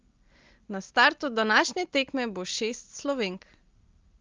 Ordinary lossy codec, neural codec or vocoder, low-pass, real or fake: Opus, 32 kbps; none; 7.2 kHz; real